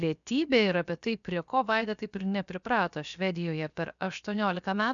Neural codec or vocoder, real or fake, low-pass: codec, 16 kHz, about 1 kbps, DyCAST, with the encoder's durations; fake; 7.2 kHz